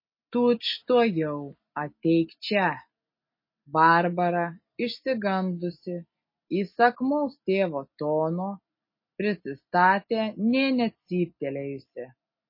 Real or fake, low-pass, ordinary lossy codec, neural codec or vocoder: real; 5.4 kHz; MP3, 24 kbps; none